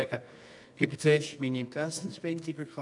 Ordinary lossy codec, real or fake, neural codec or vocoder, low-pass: MP3, 96 kbps; fake; codec, 24 kHz, 0.9 kbps, WavTokenizer, medium music audio release; 10.8 kHz